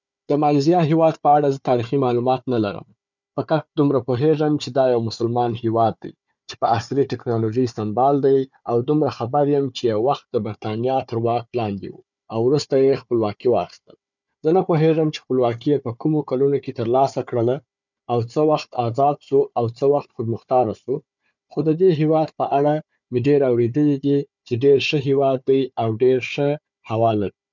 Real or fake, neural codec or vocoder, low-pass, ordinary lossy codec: fake; codec, 16 kHz, 4 kbps, FunCodec, trained on Chinese and English, 50 frames a second; 7.2 kHz; none